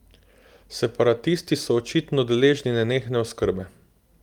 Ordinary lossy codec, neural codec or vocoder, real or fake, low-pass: Opus, 32 kbps; none; real; 19.8 kHz